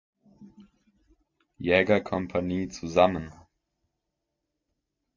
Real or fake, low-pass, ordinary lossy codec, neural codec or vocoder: real; 7.2 kHz; MP3, 48 kbps; none